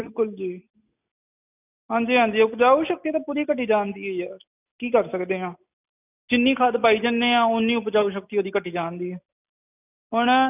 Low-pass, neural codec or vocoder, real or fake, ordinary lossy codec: 3.6 kHz; none; real; none